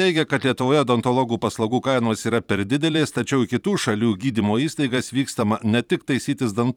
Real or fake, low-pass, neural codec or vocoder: fake; 19.8 kHz; vocoder, 44.1 kHz, 128 mel bands every 512 samples, BigVGAN v2